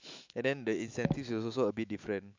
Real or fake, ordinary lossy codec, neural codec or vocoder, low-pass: fake; MP3, 64 kbps; autoencoder, 48 kHz, 128 numbers a frame, DAC-VAE, trained on Japanese speech; 7.2 kHz